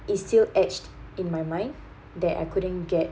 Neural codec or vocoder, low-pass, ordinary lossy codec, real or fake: none; none; none; real